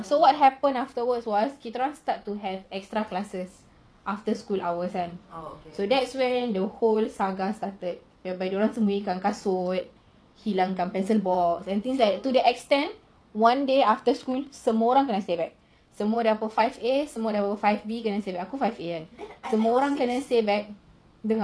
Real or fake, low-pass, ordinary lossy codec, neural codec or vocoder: fake; none; none; vocoder, 22.05 kHz, 80 mel bands, WaveNeXt